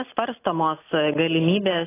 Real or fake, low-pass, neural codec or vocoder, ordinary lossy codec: real; 3.6 kHz; none; AAC, 16 kbps